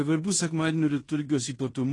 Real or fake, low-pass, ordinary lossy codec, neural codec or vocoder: fake; 10.8 kHz; AAC, 32 kbps; codec, 16 kHz in and 24 kHz out, 0.9 kbps, LongCat-Audio-Codec, fine tuned four codebook decoder